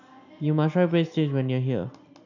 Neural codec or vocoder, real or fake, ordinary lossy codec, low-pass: none; real; none; 7.2 kHz